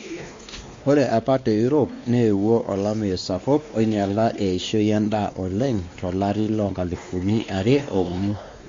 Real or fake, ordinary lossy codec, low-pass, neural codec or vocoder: fake; MP3, 48 kbps; 7.2 kHz; codec, 16 kHz, 2 kbps, X-Codec, WavLM features, trained on Multilingual LibriSpeech